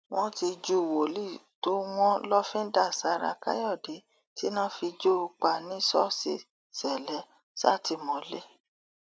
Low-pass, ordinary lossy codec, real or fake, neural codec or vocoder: none; none; real; none